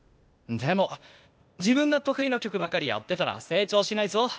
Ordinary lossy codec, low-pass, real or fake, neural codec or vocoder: none; none; fake; codec, 16 kHz, 0.8 kbps, ZipCodec